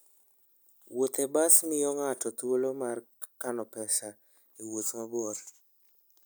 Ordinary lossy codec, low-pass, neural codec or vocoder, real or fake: none; none; none; real